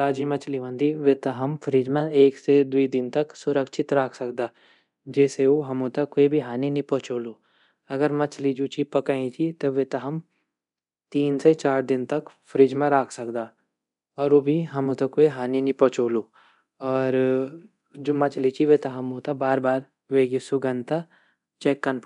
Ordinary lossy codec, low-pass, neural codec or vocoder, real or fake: none; 10.8 kHz; codec, 24 kHz, 0.9 kbps, DualCodec; fake